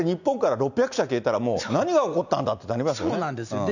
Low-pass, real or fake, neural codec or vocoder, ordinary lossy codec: 7.2 kHz; real; none; none